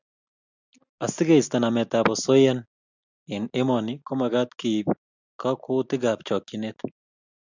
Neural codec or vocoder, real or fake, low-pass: none; real; 7.2 kHz